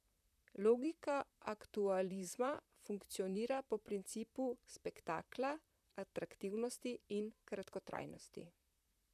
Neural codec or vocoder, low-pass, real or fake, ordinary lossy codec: vocoder, 44.1 kHz, 128 mel bands, Pupu-Vocoder; 14.4 kHz; fake; none